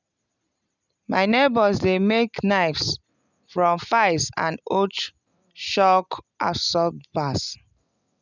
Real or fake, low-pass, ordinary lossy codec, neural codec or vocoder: real; 7.2 kHz; none; none